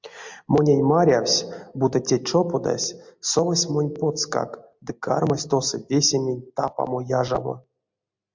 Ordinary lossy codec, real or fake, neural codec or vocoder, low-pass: MP3, 64 kbps; real; none; 7.2 kHz